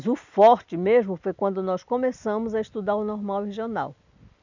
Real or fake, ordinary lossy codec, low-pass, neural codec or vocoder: real; none; 7.2 kHz; none